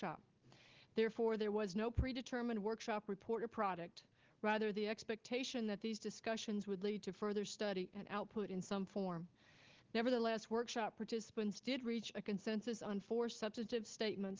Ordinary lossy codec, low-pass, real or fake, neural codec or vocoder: Opus, 16 kbps; 7.2 kHz; real; none